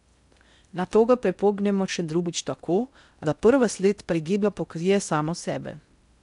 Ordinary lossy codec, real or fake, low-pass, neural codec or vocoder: none; fake; 10.8 kHz; codec, 16 kHz in and 24 kHz out, 0.6 kbps, FocalCodec, streaming, 2048 codes